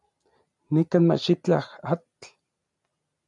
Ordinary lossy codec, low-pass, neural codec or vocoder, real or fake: MP3, 96 kbps; 10.8 kHz; none; real